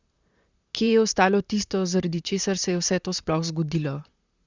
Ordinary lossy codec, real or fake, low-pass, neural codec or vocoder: Opus, 64 kbps; fake; 7.2 kHz; vocoder, 44.1 kHz, 128 mel bands, Pupu-Vocoder